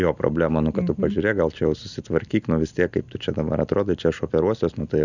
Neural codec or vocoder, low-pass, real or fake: none; 7.2 kHz; real